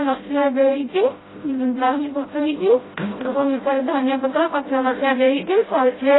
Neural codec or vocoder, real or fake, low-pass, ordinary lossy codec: codec, 16 kHz, 0.5 kbps, FreqCodec, smaller model; fake; 7.2 kHz; AAC, 16 kbps